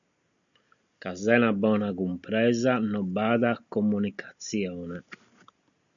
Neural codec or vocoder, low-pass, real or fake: none; 7.2 kHz; real